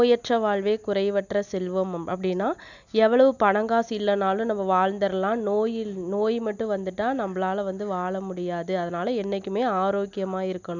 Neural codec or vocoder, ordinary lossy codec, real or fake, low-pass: none; none; real; 7.2 kHz